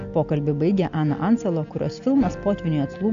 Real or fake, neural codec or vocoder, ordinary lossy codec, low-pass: real; none; AAC, 64 kbps; 7.2 kHz